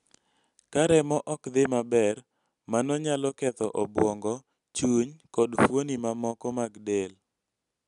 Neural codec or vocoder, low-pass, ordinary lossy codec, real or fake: none; 10.8 kHz; none; real